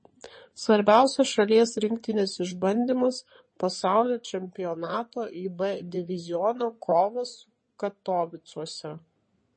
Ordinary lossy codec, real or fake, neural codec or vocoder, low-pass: MP3, 32 kbps; fake; codec, 16 kHz in and 24 kHz out, 2.2 kbps, FireRedTTS-2 codec; 9.9 kHz